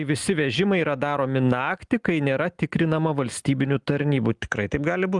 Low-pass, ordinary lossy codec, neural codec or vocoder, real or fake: 10.8 kHz; Opus, 32 kbps; none; real